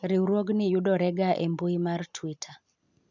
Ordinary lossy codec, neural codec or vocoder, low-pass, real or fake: none; none; 7.2 kHz; real